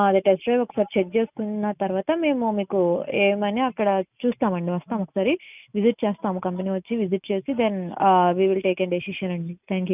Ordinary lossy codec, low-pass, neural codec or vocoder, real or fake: none; 3.6 kHz; none; real